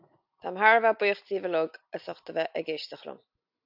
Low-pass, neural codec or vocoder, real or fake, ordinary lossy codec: 5.4 kHz; none; real; AAC, 48 kbps